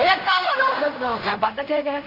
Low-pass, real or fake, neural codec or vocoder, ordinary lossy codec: 5.4 kHz; fake; codec, 16 kHz in and 24 kHz out, 0.4 kbps, LongCat-Audio-Codec, fine tuned four codebook decoder; none